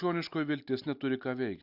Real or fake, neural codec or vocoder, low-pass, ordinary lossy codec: real; none; 5.4 kHz; Opus, 64 kbps